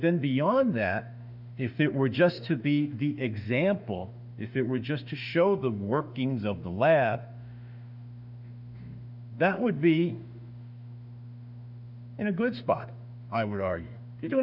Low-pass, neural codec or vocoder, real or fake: 5.4 kHz; autoencoder, 48 kHz, 32 numbers a frame, DAC-VAE, trained on Japanese speech; fake